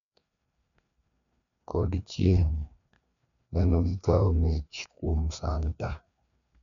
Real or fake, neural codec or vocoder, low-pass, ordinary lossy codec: fake; codec, 16 kHz, 2 kbps, FreqCodec, larger model; 7.2 kHz; none